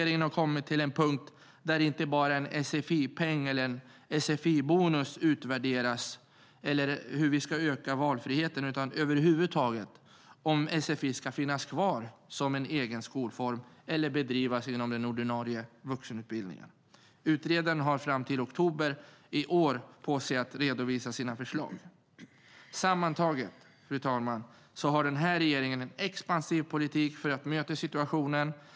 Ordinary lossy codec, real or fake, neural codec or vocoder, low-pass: none; real; none; none